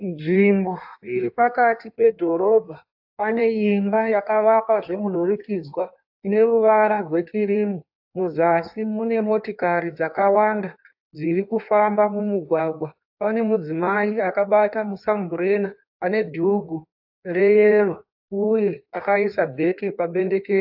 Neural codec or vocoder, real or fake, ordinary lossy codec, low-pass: codec, 16 kHz in and 24 kHz out, 1.1 kbps, FireRedTTS-2 codec; fake; AAC, 48 kbps; 5.4 kHz